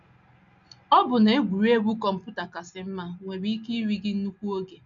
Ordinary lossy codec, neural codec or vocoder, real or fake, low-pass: MP3, 64 kbps; none; real; 7.2 kHz